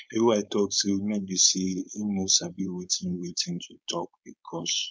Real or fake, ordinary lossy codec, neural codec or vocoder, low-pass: fake; none; codec, 16 kHz, 4.8 kbps, FACodec; none